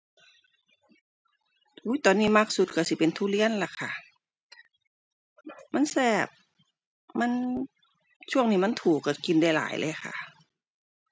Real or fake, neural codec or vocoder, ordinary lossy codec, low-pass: real; none; none; none